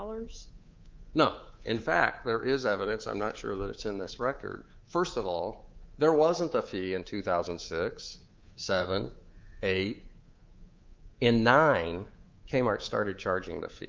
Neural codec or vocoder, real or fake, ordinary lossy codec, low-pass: codec, 24 kHz, 3.1 kbps, DualCodec; fake; Opus, 32 kbps; 7.2 kHz